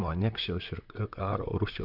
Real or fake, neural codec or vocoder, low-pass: fake; vocoder, 44.1 kHz, 128 mel bands, Pupu-Vocoder; 5.4 kHz